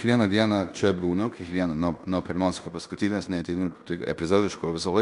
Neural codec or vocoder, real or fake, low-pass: codec, 16 kHz in and 24 kHz out, 0.9 kbps, LongCat-Audio-Codec, fine tuned four codebook decoder; fake; 10.8 kHz